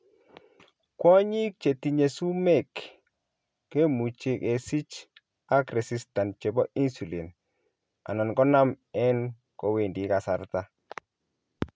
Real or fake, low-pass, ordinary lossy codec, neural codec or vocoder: real; none; none; none